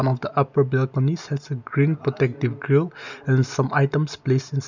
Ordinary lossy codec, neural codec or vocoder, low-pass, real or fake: none; none; 7.2 kHz; real